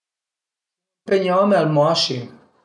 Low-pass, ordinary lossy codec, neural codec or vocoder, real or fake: 10.8 kHz; none; none; real